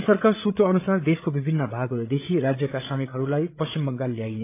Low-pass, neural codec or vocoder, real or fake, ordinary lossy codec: 3.6 kHz; codec, 16 kHz, 8 kbps, FreqCodec, larger model; fake; AAC, 24 kbps